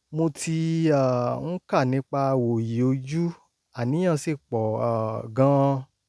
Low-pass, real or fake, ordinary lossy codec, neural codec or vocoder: none; real; none; none